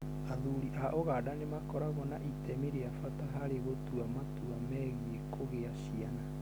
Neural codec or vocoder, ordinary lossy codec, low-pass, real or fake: none; none; none; real